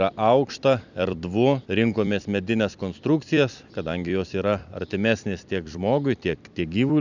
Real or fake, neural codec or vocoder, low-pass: fake; vocoder, 44.1 kHz, 128 mel bands every 256 samples, BigVGAN v2; 7.2 kHz